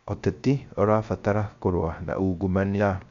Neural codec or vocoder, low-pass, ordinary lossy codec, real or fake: codec, 16 kHz, 0.3 kbps, FocalCodec; 7.2 kHz; MP3, 64 kbps; fake